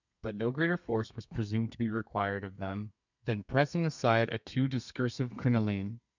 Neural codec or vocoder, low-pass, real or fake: codec, 32 kHz, 1.9 kbps, SNAC; 7.2 kHz; fake